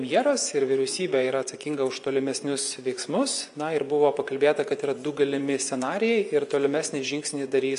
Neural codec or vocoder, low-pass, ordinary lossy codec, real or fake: vocoder, 24 kHz, 100 mel bands, Vocos; 10.8 kHz; MP3, 96 kbps; fake